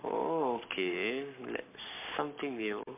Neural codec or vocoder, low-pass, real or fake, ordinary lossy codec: codec, 44.1 kHz, 7.8 kbps, Pupu-Codec; 3.6 kHz; fake; MP3, 32 kbps